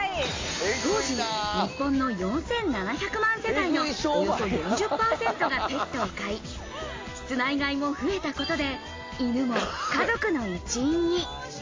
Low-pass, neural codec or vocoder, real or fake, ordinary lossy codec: 7.2 kHz; none; real; AAC, 32 kbps